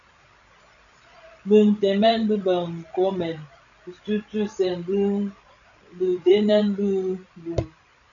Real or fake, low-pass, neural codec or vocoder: fake; 7.2 kHz; codec, 16 kHz, 16 kbps, FreqCodec, larger model